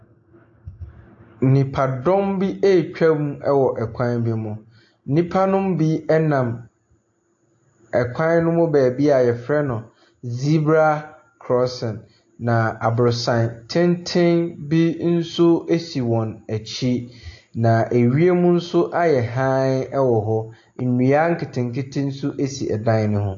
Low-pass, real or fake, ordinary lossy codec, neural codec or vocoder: 7.2 kHz; real; AAC, 48 kbps; none